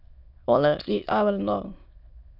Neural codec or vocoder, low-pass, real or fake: autoencoder, 22.05 kHz, a latent of 192 numbers a frame, VITS, trained on many speakers; 5.4 kHz; fake